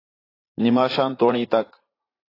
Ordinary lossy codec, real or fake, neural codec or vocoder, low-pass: AAC, 24 kbps; fake; vocoder, 44.1 kHz, 80 mel bands, Vocos; 5.4 kHz